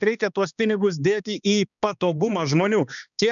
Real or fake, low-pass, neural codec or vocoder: fake; 7.2 kHz; codec, 16 kHz, 2 kbps, X-Codec, HuBERT features, trained on balanced general audio